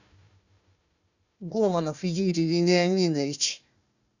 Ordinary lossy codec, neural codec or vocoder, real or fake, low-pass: none; codec, 16 kHz, 1 kbps, FunCodec, trained on Chinese and English, 50 frames a second; fake; 7.2 kHz